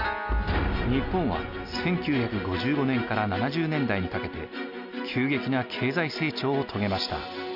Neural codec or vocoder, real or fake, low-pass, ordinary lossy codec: none; real; 5.4 kHz; none